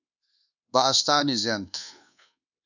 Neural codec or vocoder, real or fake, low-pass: codec, 24 kHz, 1.2 kbps, DualCodec; fake; 7.2 kHz